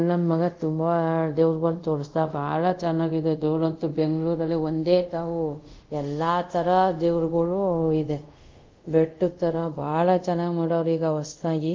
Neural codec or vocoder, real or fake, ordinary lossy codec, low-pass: codec, 24 kHz, 0.5 kbps, DualCodec; fake; Opus, 24 kbps; 7.2 kHz